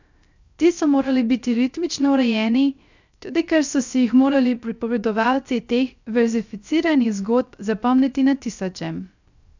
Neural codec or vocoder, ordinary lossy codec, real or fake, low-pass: codec, 16 kHz, 0.3 kbps, FocalCodec; none; fake; 7.2 kHz